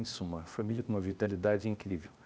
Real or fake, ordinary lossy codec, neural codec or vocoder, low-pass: fake; none; codec, 16 kHz, 0.8 kbps, ZipCodec; none